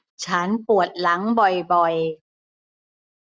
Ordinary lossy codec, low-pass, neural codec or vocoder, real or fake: none; none; none; real